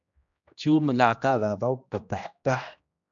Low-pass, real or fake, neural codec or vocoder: 7.2 kHz; fake; codec, 16 kHz, 1 kbps, X-Codec, HuBERT features, trained on general audio